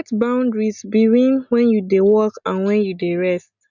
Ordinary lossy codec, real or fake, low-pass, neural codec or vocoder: none; real; 7.2 kHz; none